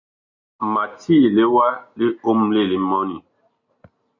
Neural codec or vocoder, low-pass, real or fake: none; 7.2 kHz; real